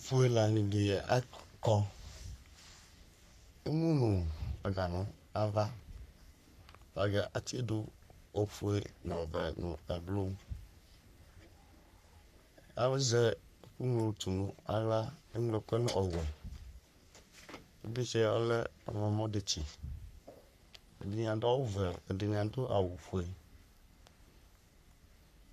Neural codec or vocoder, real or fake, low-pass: codec, 44.1 kHz, 3.4 kbps, Pupu-Codec; fake; 14.4 kHz